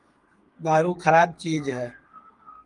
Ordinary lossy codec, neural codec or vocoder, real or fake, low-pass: Opus, 32 kbps; codec, 32 kHz, 1.9 kbps, SNAC; fake; 10.8 kHz